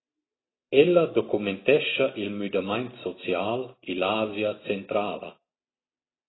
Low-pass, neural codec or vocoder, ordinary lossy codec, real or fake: 7.2 kHz; none; AAC, 16 kbps; real